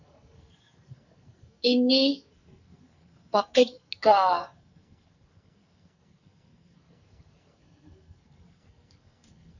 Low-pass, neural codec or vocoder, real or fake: 7.2 kHz; codec, 44.1 kHz, 2.6 kbps, SNAC; fake